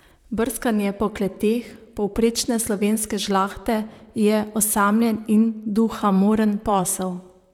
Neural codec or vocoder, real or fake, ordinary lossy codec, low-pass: vocoder, 44.1 kHz, 128 mel bands, Pupu-Vocoder; fake; none; 19.8 kHz